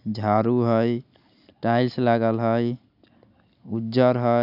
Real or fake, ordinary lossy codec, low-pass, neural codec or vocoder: real; none; 5.4 kHz; none